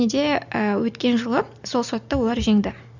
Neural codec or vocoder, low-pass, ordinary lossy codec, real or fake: none; 7.2 kHz; none; real